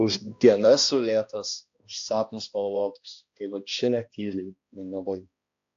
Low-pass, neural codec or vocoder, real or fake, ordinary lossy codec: 7.2 kHz; codec, 16 kHz, 1 kbps, X-Codec, HuBERT features, trained on balanced general audio; fake; AAC, 48 kbps